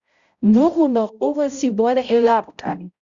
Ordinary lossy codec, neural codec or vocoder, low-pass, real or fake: Opus, 64 kbps; codec, 16 kHz, 0.5 kbps, X-Codec, HuBERT features, trained on balanced general audio; 7.2 kHz; fake